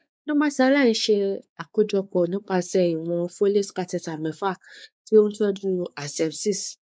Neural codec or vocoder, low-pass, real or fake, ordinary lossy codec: codec, 16 kHz, 4 kbps, X-Codec, WavLM features, trained on Multilingual LibriSpeech; none; fake; none